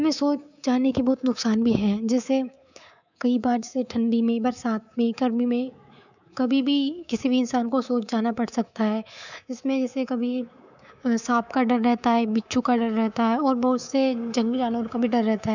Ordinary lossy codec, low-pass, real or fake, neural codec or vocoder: none; 7.2 kHz; fake; codec, 24 kHz, 3.1 kbps, DualCodec